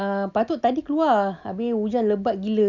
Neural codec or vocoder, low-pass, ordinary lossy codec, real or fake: none; 7.2 kHz; none; real